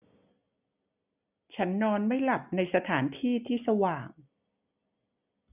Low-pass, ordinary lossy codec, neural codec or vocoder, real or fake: 3.6 kHz; none; none; real